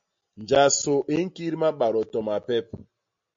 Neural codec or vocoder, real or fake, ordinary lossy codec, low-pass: none; real; MP3, 48 kbps; 7.2 kHz